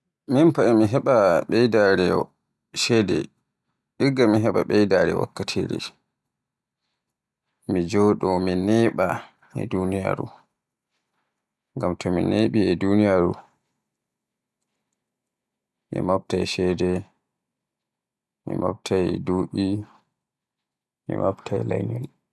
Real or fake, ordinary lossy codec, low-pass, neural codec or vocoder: real; none; none; none